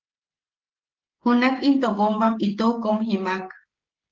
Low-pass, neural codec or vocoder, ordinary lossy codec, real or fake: 7.2 kHz; codec, 16 kHz, 16 kbps, FreqCodec, smaller model; Opus, 16 kbps; fake